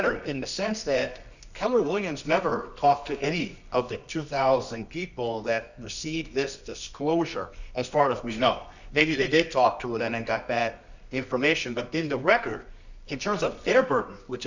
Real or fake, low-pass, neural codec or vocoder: fake; 7.2 kHz; codec, 24 kHz, 0.9 kbps, WavTokenizer, medium music audio release